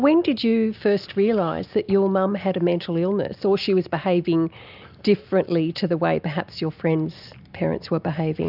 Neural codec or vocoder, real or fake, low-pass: none; real; 5.4 kHz